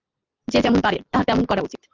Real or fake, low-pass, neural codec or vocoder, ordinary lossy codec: real; 7.2 kHz; none; Opus, 24 kbps